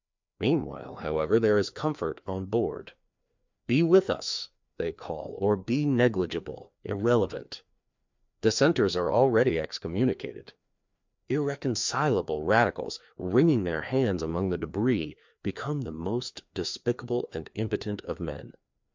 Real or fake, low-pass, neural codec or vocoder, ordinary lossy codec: fake; 7.2 kHz; codec, 16 kHz, 2 kbps, FreqCodec, larger model; MP3, 64 kbps